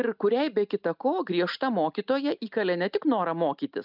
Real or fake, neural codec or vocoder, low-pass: real; none; 5.4 kHz